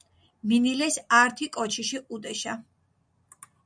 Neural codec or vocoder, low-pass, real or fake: none; 9.9 kHz; real